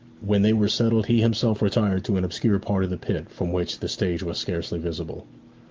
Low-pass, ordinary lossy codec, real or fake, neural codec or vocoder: 7.2 kHz; Opus, 32 kbps; real; none